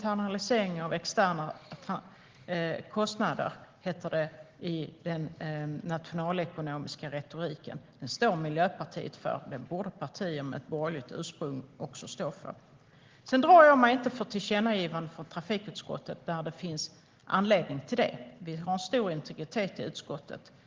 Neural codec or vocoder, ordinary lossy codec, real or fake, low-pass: none; Opus, 16 kbps; real; 7.2 kHz